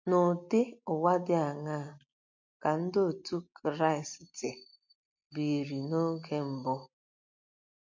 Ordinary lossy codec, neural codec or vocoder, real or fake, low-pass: MP3, 48 kbps; none; real; 7.2 kHz